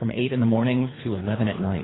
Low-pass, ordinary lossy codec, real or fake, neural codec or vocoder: 7.2 kHz; AAC, 16 kbps; fake; codec, 24 kHz, 3 kbps, HILCodec